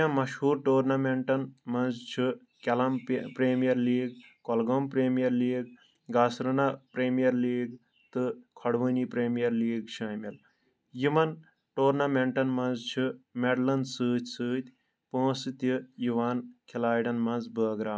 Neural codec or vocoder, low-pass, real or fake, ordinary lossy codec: none; none; real; none